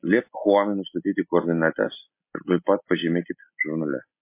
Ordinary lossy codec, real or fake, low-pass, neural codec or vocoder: MP3, 24 kbps; real; 3.6 kHz; none